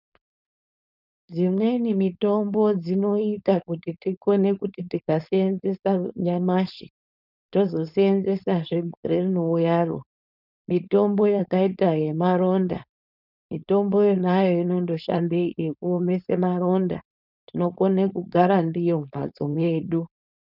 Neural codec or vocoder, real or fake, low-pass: codec, 16 kHz, 4.8 kbps, FACodec; fake; 5.4 kHz